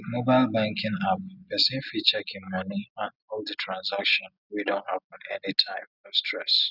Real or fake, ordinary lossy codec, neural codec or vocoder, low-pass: real; none; none; 5.4 kHz